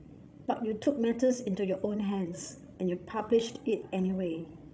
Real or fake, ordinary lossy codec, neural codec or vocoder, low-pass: fake; none; codec, 16 kHz, 16 kbps, FreqCodec, larger model; none